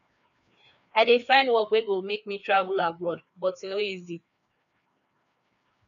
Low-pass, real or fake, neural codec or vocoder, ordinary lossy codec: 7.2 kHz; fake; codec, 16 kHz, 2 kbps, FreqCodec, larger model; none